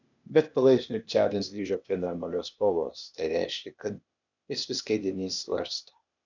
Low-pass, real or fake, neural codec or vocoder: 7.2 kHz; fake; codec, 16 kHz, 0.8 kbps, ZipCodec